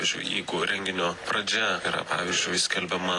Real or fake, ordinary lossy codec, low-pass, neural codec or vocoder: real; AAC, 32 kbps; 10.8 kHz; none